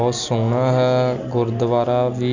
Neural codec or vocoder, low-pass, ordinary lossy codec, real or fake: none; 7.2 kHz; none; real